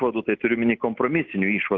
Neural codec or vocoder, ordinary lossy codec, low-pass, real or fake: none; Opus, 32 kbps; 7.2 kHz; real